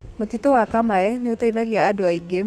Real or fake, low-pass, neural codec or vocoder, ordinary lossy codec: fake; 14.4 kHz; codec, 32 kHz, 1.9 kbps, SNAC; none